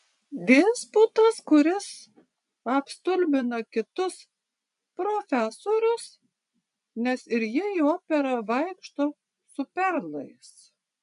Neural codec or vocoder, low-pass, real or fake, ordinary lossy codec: vocoder, 24 kHz, 100 mel bands, Vocos; 10.8 kHz; fake; MP3, 96 kbps